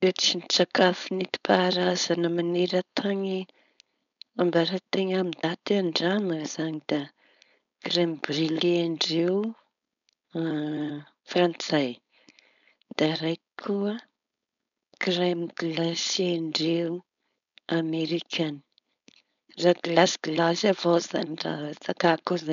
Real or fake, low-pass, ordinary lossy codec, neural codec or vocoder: fake; 7.2 kHz; none; codec, 16 kHz, 4.8 kbps, FACodec